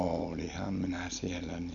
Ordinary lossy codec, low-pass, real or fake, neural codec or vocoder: none; 7.2 kHz; real; none